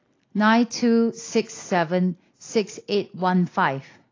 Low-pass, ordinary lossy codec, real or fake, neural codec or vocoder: 7.2 kHz; AAC, 32 kbps; real; none